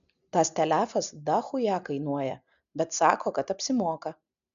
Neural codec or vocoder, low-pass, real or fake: none; 7.2 kHz; real